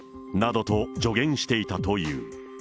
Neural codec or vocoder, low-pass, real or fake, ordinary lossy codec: none; none; real; none